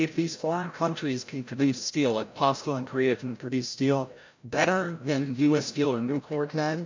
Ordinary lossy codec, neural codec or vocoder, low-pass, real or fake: MP3, 64 kbps; codec, 16 kHz, 0.5 kbps, FreqCodec, larger model; 7.2 kHz; fake